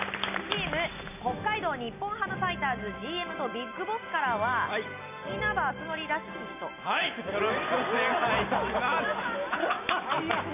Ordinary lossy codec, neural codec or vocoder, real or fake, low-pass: none; none; real; 3.6 kHz